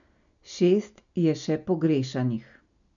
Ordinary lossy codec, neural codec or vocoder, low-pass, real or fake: none; none; 7.2 kHz; real